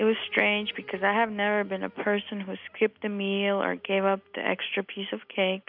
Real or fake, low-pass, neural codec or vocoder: real; 5.4 kHz; none